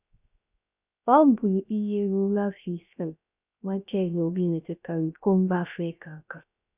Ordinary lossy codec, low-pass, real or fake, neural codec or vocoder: none; 3.6 kHz; fake; codec, 16 kHz, 0.3 kbps, FocalCodec